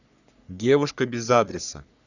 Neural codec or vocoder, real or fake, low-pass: codec, 44.1 kHz, 3.4 kbps, Pupu-Codec; fake; 7.2 kHz